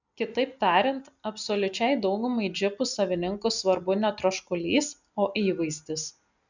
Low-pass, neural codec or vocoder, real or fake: 7.2 kHz; none; real